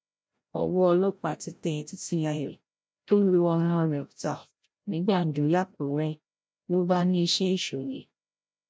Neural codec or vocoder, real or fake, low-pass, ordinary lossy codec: codec, 16 kHz, 0.5 kbps, FreqCodec, larger model; fake; none; none